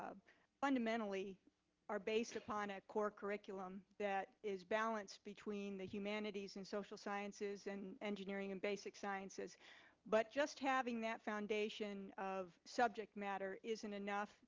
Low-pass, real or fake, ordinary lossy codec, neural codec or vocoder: 7.2 kHz; real; Opus, 32 kbps; none